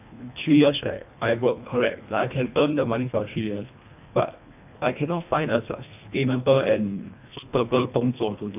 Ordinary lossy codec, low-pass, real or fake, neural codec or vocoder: AAC, 32 kbps; 3.6 kHz; fake; codec, 24 kHz, 1.5 kbps, HILCodec